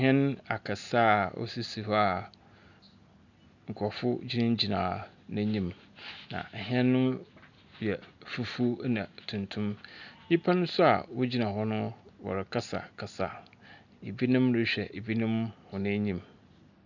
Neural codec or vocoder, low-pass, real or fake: none; 7.2 kHz; real